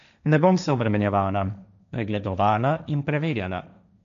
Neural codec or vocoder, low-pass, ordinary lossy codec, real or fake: codec, 16 kHz, 1.1 kbps, Voila-Tokenizer; 7.2 kHz; none; fake